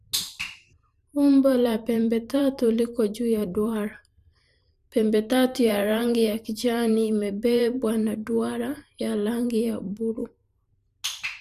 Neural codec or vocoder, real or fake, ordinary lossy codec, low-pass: vocoder, 44.1 kHz, 128 mel bands every 512 samples, BigVGAN v2; fake; none; 14.4 kHz